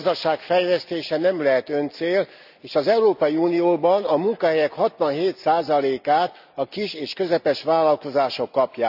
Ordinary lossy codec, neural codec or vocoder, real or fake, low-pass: none; none; real; 5.4 kHz